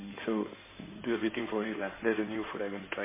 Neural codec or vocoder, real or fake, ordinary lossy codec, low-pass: codec, 16 kHz in and 24 kHz out, 2.2 kbps, FireRedTTS-2 codec; fake; MP3, 16 kbps; 3.6 kHz